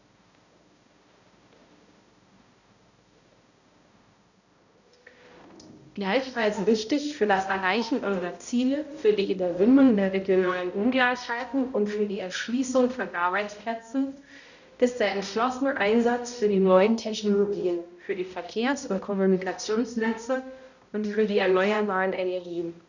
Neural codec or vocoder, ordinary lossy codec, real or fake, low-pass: codec, 16 kHz, 0.5 kbps, X-Codec, HuBERT features, trained on balanced general audio; none; fake; 7.2 kHz